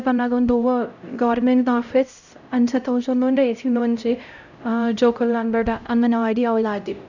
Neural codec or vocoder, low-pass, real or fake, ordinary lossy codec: codec, 16 kHz, 0.5 kbps, X-Codec, HuBERT features, trained on LibriSpeech; 7.2 kHz; fake; none